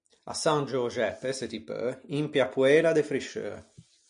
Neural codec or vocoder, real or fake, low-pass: none; real; 9.9 kHz